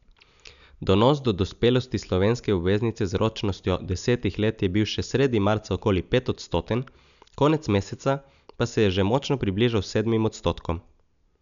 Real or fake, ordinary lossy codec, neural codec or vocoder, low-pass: real; none; none; 7.2 kHz